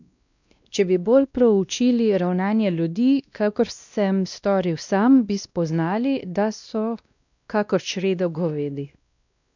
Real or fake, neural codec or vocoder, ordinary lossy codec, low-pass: fake; codec, 16 kHz, 1 kbps, X-Codec, WavLM features, trained on Multilingual LibriSpeech; none; 7.2 kHz